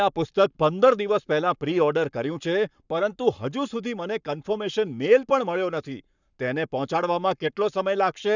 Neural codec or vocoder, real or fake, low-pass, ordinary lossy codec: codec, 44.1 kHz, 7.8 kbps, Pupu-Codec; fake; 7.2 kHz; none